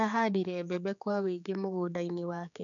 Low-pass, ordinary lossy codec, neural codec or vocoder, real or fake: 7.2 kHz; none; codec, 16 kHz, 4 kbps, X-Codec, HuBERT features, trained on general audio; fake